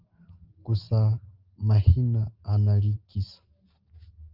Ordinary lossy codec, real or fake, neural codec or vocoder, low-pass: Opus, 24 kbps; real; none; 5.4 kHz